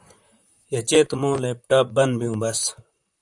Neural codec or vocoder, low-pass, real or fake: vocoder, 44.1 kHz, 128 mel bands, Pupu-Vocoder; 10.8 kHz; fake